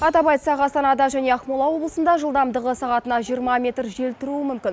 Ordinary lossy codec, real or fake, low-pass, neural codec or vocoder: none; real; none; none